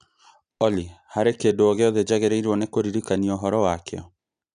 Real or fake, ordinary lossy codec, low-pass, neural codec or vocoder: real; none; 9.9 kHz; none